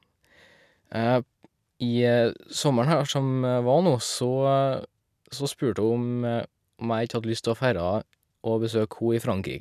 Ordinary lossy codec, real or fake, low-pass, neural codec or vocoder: none; real; 14.4 kHz; none